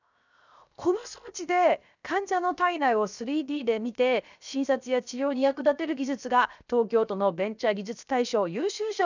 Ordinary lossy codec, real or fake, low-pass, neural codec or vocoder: none; fake; 7.2 kHz; codec, 16 kHz, 0.7 kbps, FocalCodec